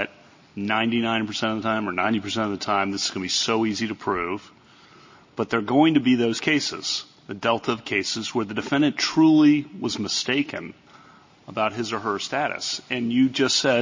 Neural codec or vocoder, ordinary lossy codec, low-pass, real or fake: none; MP3, 32 kbps; 7.2 kHz; real